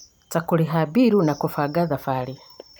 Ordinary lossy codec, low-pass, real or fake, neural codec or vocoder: none; none; fake; vocoder, 44.1 kHz, 128 mel bands every 512 samples, BigVGAN v2